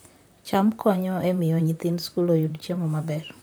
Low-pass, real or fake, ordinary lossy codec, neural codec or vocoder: none; fake; none; vocoder, 44.1 kHz, 128 mel bands, Pupu-Vocoder